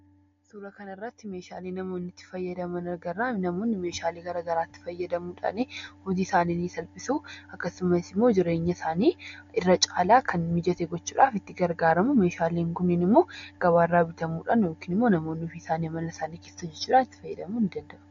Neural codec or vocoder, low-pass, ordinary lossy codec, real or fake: none; 7.2 kHz; AAC, 48 kbps; real